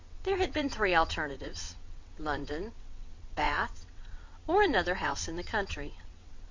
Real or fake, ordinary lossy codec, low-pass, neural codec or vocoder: fake; MP3, 48 kbps; 7.2 kHz; vocoder, 22.05 kHz, 80 mel bands, WaveNeXt